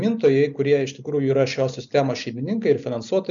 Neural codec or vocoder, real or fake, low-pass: none; real; 7.2 kHz